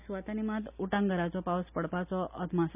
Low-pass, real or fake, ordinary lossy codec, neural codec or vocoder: 3.6 kHz; real; none; none